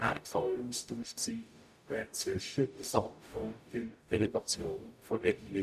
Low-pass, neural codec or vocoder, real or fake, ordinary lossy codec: 14.4 kHz; codec, 44.1 kHz, 0.9 kbps, DAC; fake; none